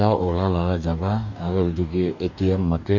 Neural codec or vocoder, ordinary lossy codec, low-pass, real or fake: codec, 44.1 kHz, 2.6 kbps, DAC; none; 7.2 kHz; fake